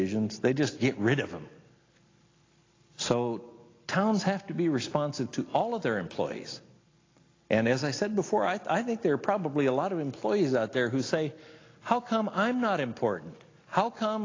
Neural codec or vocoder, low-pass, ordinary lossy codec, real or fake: none; 7.2 kHz; AAC, 32 kbps; real